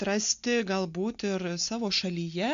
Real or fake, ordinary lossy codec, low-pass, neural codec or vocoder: real; MP3, 64 kbps; 7.2 kHz; none